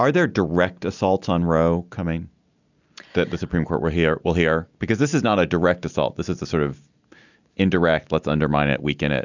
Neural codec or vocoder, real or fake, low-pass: none; real; 7.2 kHz